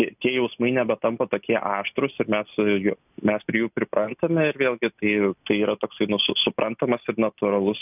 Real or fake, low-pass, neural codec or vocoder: real; 3.6 kHz; none